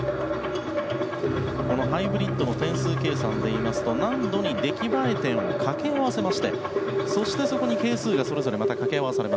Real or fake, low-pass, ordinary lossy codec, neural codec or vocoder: real; none; none; none